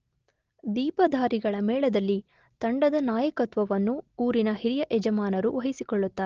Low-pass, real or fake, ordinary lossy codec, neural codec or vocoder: 7.2 kHz; real; Opus, 16 kbps; none